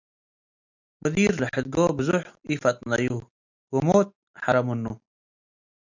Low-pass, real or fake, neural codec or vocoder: 7.2 kHz; real; none